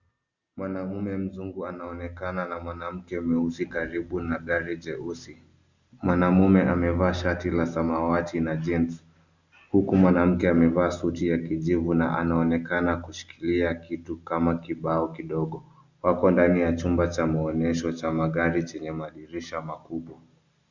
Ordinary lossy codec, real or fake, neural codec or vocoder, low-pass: Opus, 64 kbps; real; none; 7.2 kHz